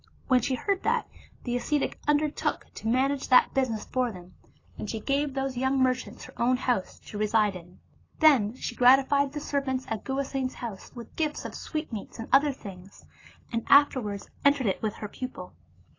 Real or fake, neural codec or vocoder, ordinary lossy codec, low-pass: real; none; AAC, 32 kbps; 7.2 kHz